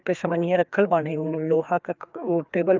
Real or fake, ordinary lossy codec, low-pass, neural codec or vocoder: fake; Opus, 24 kbps; 7.2 kHz; codec, 16 kHz, 2 kbps, FreqCodec, larger model